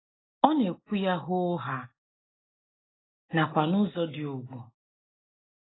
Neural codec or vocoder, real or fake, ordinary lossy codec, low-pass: none; real; AAC, 16 kbps; 7.2 kHz